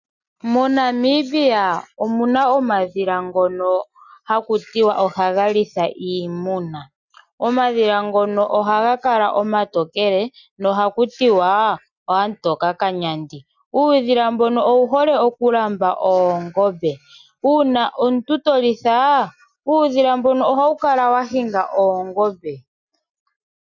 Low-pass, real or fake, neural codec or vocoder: 7.2 kHz; real; none